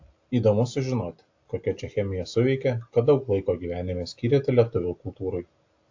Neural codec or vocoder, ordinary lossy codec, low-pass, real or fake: none; AAC, 48 kbps; 7.2 kHz; real